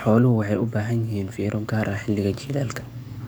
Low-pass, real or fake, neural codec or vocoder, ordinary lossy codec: none; fake; codec, 44.1 kHz, 7.8 kbps, DAC; none